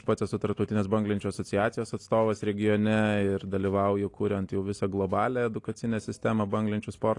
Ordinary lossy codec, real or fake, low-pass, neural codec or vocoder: AAC, 48 kbps; real; 10.8 kHz; none